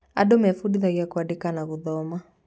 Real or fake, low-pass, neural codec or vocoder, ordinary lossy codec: real; none; none; none